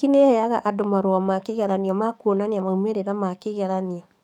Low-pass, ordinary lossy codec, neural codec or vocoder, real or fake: 19.8 kHz; none; codec, 44.1 kHz, 7.8 kbps, DAC; fake